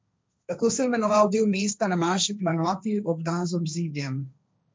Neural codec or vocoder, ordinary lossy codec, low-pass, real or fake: codec, 16 kHz, 1.1 kbps, Voila-Tokenizer; none; none; fake